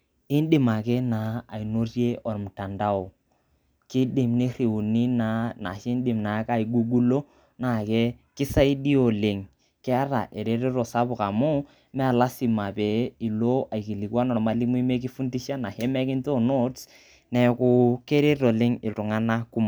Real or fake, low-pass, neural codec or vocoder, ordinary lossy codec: real; none; none; none